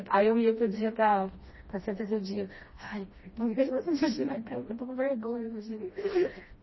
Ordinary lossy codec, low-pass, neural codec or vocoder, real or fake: MP3, 24 kbps; 7.2 kHz; codec, 16 kHz, 1 kbps, FreqCodec, smaller model; fake